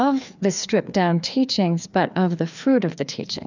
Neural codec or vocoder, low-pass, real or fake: codec, 16 kHz, 2 kbps, FreqCodec, larger model; 7.2 kHz; fake